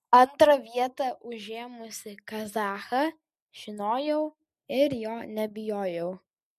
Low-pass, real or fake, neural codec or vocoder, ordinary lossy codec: 14.4 kHz; real; none; MP3, 64 kbps